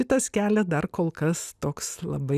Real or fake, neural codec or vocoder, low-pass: real; none; 14.4 kHz